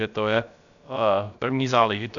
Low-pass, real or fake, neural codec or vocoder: 7.2 kHz; fake; codec, 16 kHz, about 1 kbps, DyCAST, with the encoder's durations